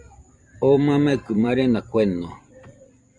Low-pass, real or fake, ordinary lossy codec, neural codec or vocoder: 10.8 kHz; fake; Opus, 64 kbps; vocoder, 44.1 kHz, 128 mel bands every 256 samples, BigVGAN v2